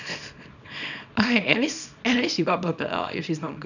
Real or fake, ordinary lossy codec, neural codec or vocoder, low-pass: fake; none; codec, 24 kHz, 0.9 kbps, WavTokenizer, small release; 7.2 kHz